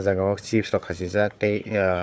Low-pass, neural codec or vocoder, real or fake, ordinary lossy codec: none; codec, 16 kHz, 4 kbps, FunCodec, trained on Chinese and English, 50 frames a second; fake; none